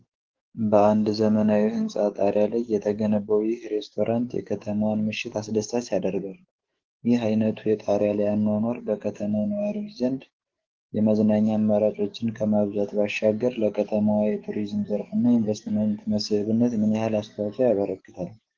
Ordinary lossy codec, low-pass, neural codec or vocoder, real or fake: Opus, 16 kbps; 7.2 kHz; codec, 44.1 kHz, 7.8 kbps, DAC; fake